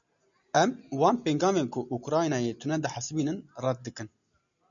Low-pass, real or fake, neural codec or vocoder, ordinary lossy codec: 7.2 kHz; real; none; MP3, 96 kbps